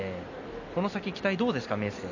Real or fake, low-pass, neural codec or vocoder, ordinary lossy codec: real; 7.2 kHz; none; none